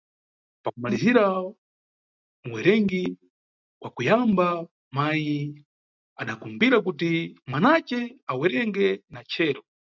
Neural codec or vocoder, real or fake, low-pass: none; real; 7.2 kHz